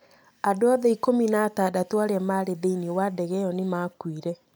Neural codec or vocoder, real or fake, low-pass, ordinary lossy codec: none; real; none; none